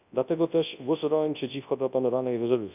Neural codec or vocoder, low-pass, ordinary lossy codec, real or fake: codec, 24 kHz, 0.9 kbps, WavTokenizer, large speech release; 3.6 kHz; none; fake